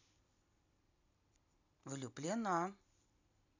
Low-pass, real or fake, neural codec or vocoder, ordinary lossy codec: 7.2 kHz; fake; vocoder, 44.1 kHz, 128 mel bands every 256 samples, BigVGAN v2; none